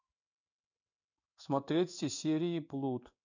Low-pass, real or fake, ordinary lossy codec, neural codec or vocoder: 7.2 kHz; fake; none; codec, 16 kHz in and 24 kHz out, 1 kbps, XY-Tokenizer